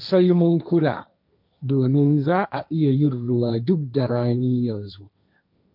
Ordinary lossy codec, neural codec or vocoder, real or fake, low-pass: none; codec, 16 kHz, 1.1 kbps, Voila-Tokenizer; fake; 5.4 kHz